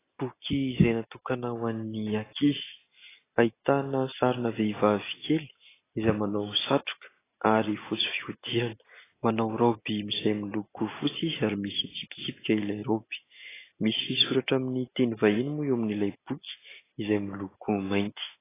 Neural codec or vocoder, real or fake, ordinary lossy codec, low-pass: none; real; AAC, 16 kbps; 3.6 kHz